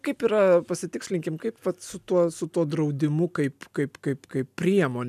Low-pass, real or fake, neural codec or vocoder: 14.4 kHz; real; none